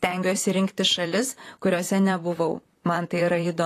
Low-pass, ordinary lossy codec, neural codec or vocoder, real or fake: 14.4 kHz; AAC, 48 kbps; vocoder, 44.1 kHz, 128 mel bands, Pupu-Vocoder; fake